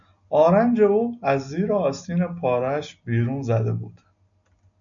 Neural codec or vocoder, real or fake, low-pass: none; real; 7.2 kHz